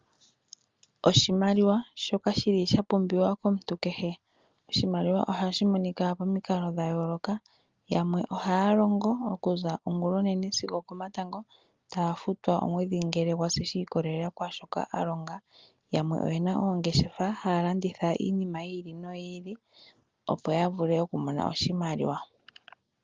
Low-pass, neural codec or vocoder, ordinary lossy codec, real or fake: 7.2 kHz; none; Opus, 32 kbps; real